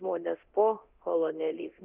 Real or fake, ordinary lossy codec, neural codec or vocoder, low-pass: fake; Opus, 24 kbps; codec, 24 kHz, 0.9 kbps, DualCodec; 3.6 kHz